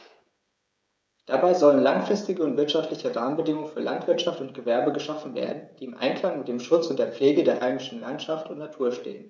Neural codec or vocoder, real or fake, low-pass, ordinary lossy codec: codec, 16 kHz, 16 kbps, FreqCodec, smaller model; fake; none; none